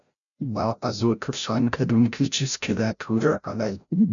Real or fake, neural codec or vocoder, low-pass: fake; codec, 16 kHz, 0.5 kbps, FreqCodec, larger model; 7.2 kHz